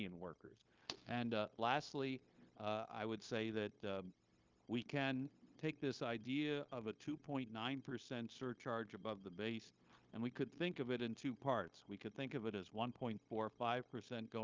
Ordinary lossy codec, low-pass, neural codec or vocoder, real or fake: Opus, 32 kbps; 7.2 kHz; codec, 16 kHz, 0.9 kbps, LongCat-Audio-Codec; fake